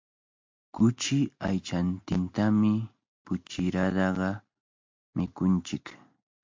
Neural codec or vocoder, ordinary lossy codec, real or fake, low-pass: none; MP3, 48 kbps; real; 7.2 kHz